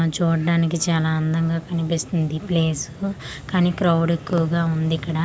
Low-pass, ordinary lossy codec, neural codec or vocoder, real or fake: none; none; none; real